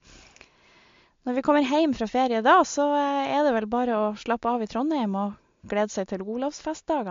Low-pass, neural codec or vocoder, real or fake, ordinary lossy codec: 7.2 kHz; none; real; MP3, 48 kbps